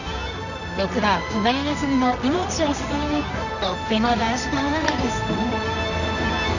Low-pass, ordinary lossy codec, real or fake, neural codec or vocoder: 7.2 kHz; none; fake; codec, 24 kHz, 0.9 kbps, WavTokenizer, medium music audio release